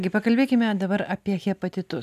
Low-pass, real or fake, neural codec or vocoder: 14.4 kHz; real; none